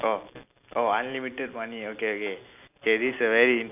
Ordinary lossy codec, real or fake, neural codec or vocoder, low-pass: none; real; none; 3.6 kHz